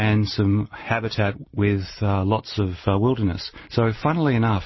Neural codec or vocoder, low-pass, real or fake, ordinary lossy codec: none; 7.2 kHz; real; MP3, 24 kbps